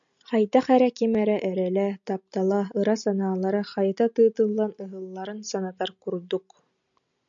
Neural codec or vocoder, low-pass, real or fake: none; 7.2 kHz; real